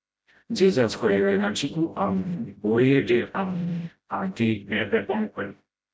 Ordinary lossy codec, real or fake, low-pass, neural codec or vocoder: none; fake; none; codec, 16 kHz, 0.5 kbps, FreqCodec, smaller model